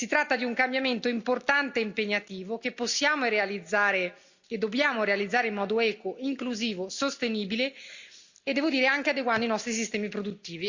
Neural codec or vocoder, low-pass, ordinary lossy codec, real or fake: none; 7.2 kHz; Opus, 64 kbps; real